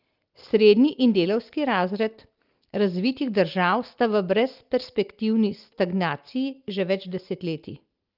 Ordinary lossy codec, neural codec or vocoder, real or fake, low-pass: Opus, 32 kbps; none; real; 5.4 kHz